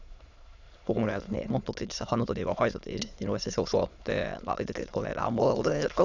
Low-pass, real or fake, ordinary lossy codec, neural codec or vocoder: 7.2 kHz; fake; none; autoencoder, 22.05 kHz, a latent of 192 numbers a frame, VITS, trained on many speakers